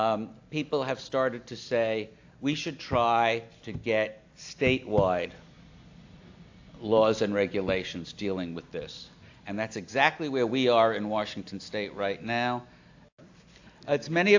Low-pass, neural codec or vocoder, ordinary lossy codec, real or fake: 7.2 kHz; none; AAC, 48 kbps; real